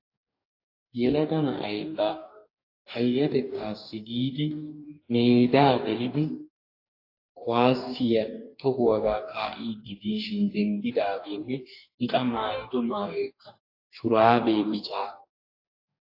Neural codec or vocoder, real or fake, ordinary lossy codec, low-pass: codec, 44.1 kHz, 2.6 kbps, DAC; fake; AAC, 32 kbps; 5.4 kHz